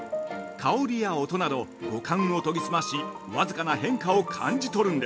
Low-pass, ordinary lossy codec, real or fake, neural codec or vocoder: none; none; real; none